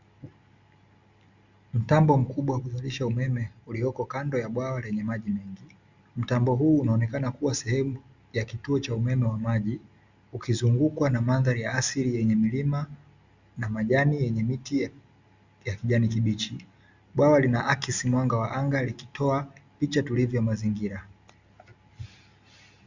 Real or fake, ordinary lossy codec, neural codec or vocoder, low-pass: real; Opus, 64 kbps; none; 7.2 kHz